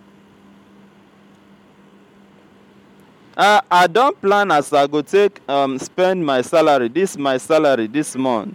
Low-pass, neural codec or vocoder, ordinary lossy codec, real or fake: 19.8 kHz; none; MP3, 96 kbps; real